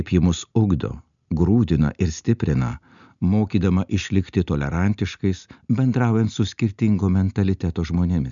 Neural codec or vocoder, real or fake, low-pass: none; real; 7.2 kHz